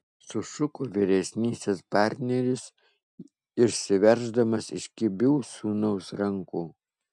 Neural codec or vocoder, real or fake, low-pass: none; real; 10.8 kHz